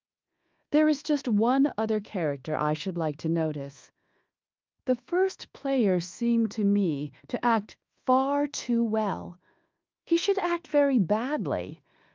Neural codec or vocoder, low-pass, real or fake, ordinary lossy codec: codec, 24 kHz, 1.2 kbps, DualCodec; 7.2 kHz; fake; Opus, 24 kbps